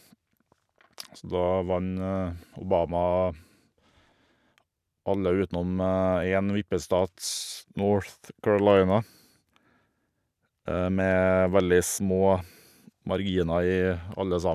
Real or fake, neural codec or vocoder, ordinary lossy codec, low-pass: real; none; none; 14.4 kHz